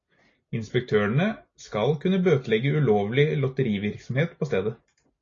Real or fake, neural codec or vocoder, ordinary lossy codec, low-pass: real; none; AAC, 32 kbps; 7.2 kHz